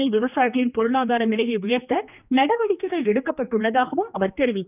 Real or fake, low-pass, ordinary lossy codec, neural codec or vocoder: fake; 3.6 kHz; none; codec, 16 kHz, 2 kbps, X-Codec, HuBERT features, trained on general audio